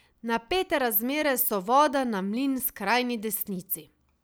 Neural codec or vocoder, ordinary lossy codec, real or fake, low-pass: none; none; real; none